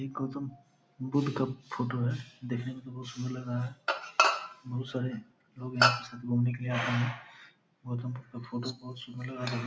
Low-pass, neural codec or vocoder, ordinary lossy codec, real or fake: none; none; none; real